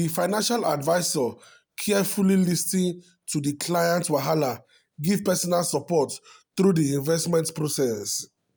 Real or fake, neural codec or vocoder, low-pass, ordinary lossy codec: real; none; none; none